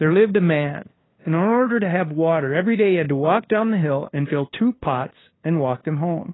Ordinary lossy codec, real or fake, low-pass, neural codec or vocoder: AAC, 16 kbps; fake; 7.2 kHz; codec, 16 kHz, 2 kbps, FunCodec, trained on LibriTTS, 25 frames a second